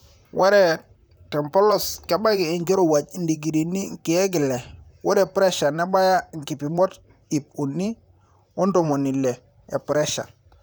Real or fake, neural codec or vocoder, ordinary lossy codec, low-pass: fake; vocoder, 44.1 kHz, 128 mel bands, Pupu-Vocoder; none; none